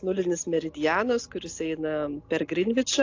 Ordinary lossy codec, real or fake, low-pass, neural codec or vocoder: AAC, 48 kbps; real; 7.2 kHz; none